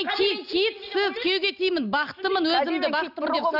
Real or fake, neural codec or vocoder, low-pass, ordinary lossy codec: real; none; 5.4 kHz; none